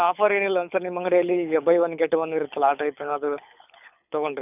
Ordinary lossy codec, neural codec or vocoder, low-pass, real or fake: none; codec, 24 kHz, 6 kbps, HILCodec; 3.6 kHz; fake